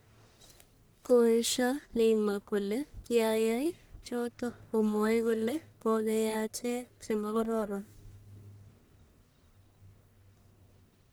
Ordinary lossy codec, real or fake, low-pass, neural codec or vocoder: none; fake; none; codec, 44.1 kHz, 1.7 kbps, Pupu-Codec